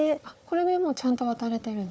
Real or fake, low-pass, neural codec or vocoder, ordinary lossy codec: fake; none; codec, 16 kHz, 4 kbps, FunCodec, trained on Chinese and English, 50 frames a second; none